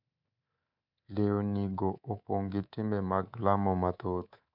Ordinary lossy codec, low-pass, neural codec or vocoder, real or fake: none; 5.4 kHz; codec, 24 kHz, 3.1 kbps, DualCodec; fake